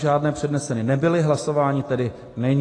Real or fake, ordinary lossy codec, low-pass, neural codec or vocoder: real; AAC, 32 kbps; 10.8 kHz; none